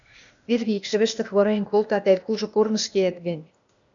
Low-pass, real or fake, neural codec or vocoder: 7.2 kHz; fake; codec, 16 kHz, 0.8 kbps, ZipCodec